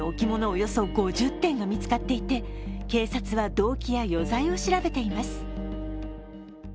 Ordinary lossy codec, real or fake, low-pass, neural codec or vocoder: none; real; none; none